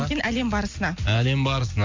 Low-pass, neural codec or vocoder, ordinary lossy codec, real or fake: 7.2 kHz; none; MP3, 48 kbps; real